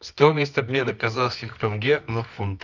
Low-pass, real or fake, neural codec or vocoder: 7.2 kHz; fake; codec, 24 kHz, 0.9 kbps, WavTokenizer, medium music audio release